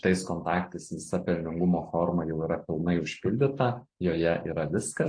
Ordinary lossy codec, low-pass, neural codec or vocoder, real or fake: AAC, 48 kbps; 9.9 kHz; none; real